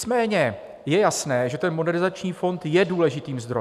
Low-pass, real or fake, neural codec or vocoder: 14.4 kHz; real; none